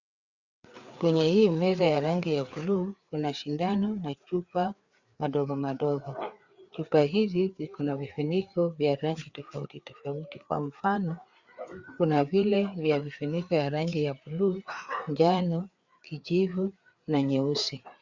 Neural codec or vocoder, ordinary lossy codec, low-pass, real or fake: codec, 16 kHz, 4 kbps, FreqCodec, larger model; Opus, 64 kbps; 7.2 kHz; fake